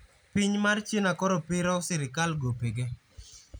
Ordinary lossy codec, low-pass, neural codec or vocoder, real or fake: none; none; none; real